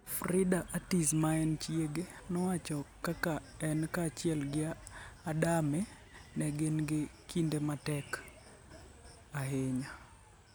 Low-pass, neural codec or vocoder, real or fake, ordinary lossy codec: none; none; real; none